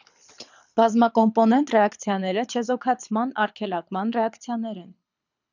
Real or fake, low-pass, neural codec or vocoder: fake; 7.2 kHz; codec, 24 kHz, 6 kbps, HILCodec